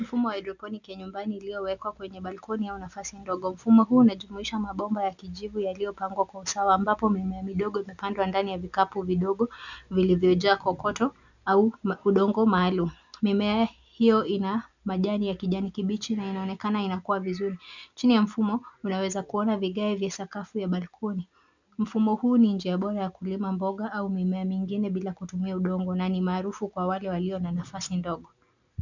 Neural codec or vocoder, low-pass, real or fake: none; 7.2 kHz; real